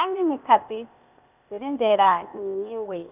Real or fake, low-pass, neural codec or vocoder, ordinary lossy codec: fake; 3.6 kHz; codec, 16 kHz, 0.8 kbps, ZipCodec; none